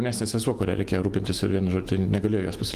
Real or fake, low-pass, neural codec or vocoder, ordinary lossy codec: fake; 14.4 kHz; autoencoder, 48 kHz, 128 numbers a frame, DAC-VAE, trained on Japanese speech; Opus, 24 kbps